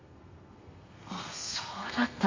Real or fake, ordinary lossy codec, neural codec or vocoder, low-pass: fake; MP3, 48 kbps; codec, 32 kHz, 1.9 kbps, SNAC; 7.2 kHz